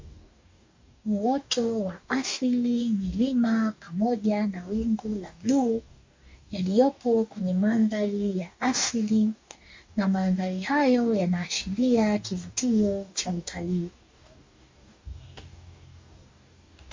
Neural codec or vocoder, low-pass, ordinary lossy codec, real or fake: codec, 44.1 kHz, 2.6 kbps, DAC; 7.2 kHz; MP3, 64 kbps; fake